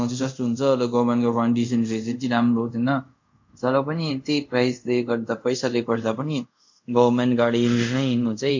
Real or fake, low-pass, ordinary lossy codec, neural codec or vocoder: fake; 7.2 kHz; MP3, 48 kbps; codec, 24 kHz, 0.5 kbps, DualCodec